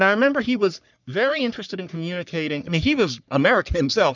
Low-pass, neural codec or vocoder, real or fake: 7.2 kHz; codec, 44.1 kHz, 3.4 kbps, Pupu-Codec; fake